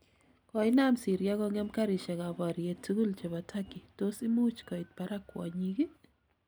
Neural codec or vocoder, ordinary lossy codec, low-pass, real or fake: none; none; none; real